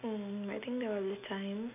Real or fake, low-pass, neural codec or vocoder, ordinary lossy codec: real; 3.6 kHz; none; none